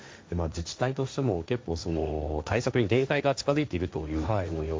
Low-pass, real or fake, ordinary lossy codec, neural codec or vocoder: none; fake; none; codec, 16 kHz, 1.1 kbps, Voila-Tokenizer